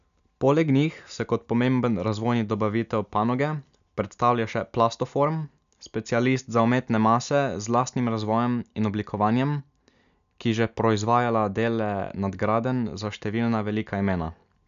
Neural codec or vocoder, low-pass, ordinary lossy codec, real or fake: none; 7.2 kHz; none; real